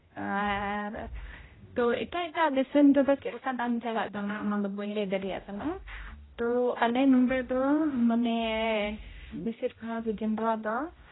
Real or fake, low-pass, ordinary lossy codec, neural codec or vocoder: fake; 7.2 kHz; AAC, 16 kbps; codec, 16 kHz, 0.5 kbps, X-Codec, HuBERT features, trained on general audio